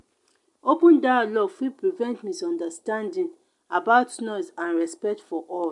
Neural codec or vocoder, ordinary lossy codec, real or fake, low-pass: none; MP3, 64 kbps; real; 10.8 kHz